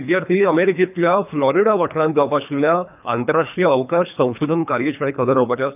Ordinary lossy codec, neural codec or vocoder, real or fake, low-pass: none; codec, 24 kHz, 3 kbps, HILCodec; fake; 3.6 kHz